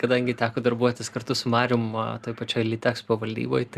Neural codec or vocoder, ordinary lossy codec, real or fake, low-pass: none; Opus, 64 kbps; real; 14.4 kHz